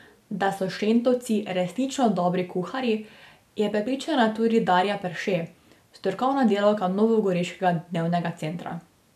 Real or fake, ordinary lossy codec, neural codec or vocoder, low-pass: real; none; none; 14.4 kHz